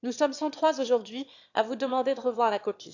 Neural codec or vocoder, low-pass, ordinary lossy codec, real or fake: autoencoder, 22.05 kHz, a latent of 192 numbers a frame, VITS, trained on one speaker; 7.2 kHz; AAC, 48 kbps; fake